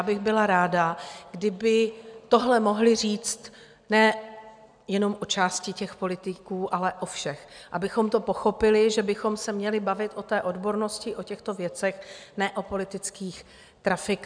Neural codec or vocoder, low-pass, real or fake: none; 9.9 kHz; real